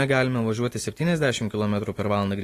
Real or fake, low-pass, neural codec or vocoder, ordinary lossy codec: real; 14.4 kHz; none; AAC, 48 kbps